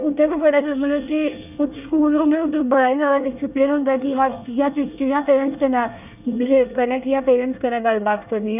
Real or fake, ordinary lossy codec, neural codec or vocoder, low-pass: fake; none; codec, 24 kHz, 1 kbps, SNAC; 3.6 kHz